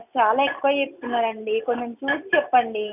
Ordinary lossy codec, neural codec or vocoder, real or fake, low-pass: none; none; real; 3.6 kHz